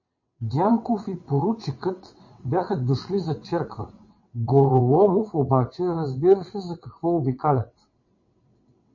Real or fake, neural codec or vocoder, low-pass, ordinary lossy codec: fake; vocoder, 22.05 kHz, 80 mel bands, WaveNeXt; 7.2 kHz; MP3, 32 kbps